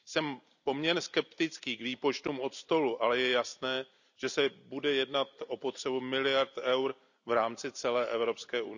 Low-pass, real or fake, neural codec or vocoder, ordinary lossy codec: 7.2 kHz; real; none; none